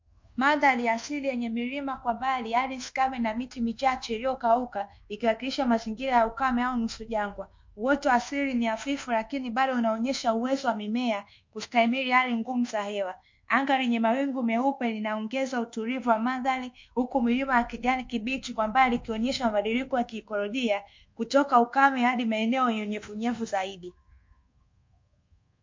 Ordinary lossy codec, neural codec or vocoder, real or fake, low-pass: MP3, 48 kbps; codec, 24 kHz, 1.2 kbps, DualCodec; fake; 7.2 kHz